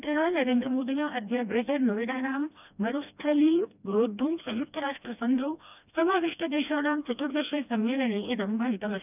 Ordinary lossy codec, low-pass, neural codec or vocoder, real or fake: none; 3.6 kHz; codec, 16 kHz, 1 kbps, FreqCodec, smaller model; fake